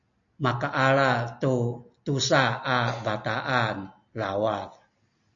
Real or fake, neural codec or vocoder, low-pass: real; none; 7.2 kHz